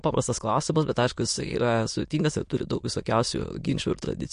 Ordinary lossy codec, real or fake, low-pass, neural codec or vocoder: MP3, 48 kbps; fake; 9.9 kHz; autoencoder, 22.05 kHz, a latent of 192 numbers a frame, VITS, trained on many speakers